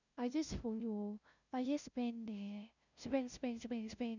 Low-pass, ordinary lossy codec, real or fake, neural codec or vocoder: 7.2 kHz; none; fake; codec, 16 kHz, 0.5 kbps, FunCodec, trained on LibriTTS, 25 frames a second